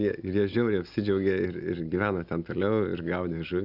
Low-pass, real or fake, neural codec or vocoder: 5.4 kHz; real; none